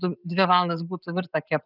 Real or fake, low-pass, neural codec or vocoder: real; 5.4 kHz; none